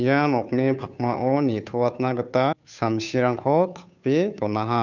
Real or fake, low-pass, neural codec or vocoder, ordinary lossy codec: fake; 7.2 kHz; codec, 16 kHz, 2 kbps, FunCodec, trained on Chinese and English, 25 frames a second; none